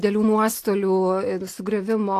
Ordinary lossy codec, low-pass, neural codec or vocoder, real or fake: AAC, 64 kbps; 14.4 kHz; none; real